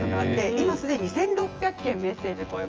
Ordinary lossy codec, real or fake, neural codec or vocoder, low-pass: Opus, 24 kbps; fake; vocoder, 24 kHz, 100 mel bands, Vocos; 7.2 kHz